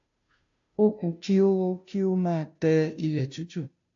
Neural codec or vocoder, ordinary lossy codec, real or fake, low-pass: codec, 16 kHz, 0.5 kbps, FunCodec, trained on Chinese and English, 25 frames a second; AAC, 64 kbps; fake; 7.2 kHz